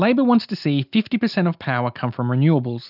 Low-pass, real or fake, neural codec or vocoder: 5.4 kHz; real; none